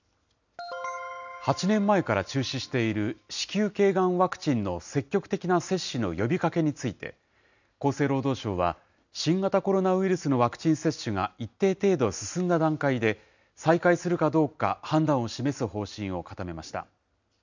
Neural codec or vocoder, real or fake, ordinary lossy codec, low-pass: none; real; none; 7.2 kHz